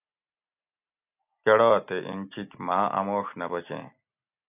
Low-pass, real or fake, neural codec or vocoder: 3.6 kHz; real; none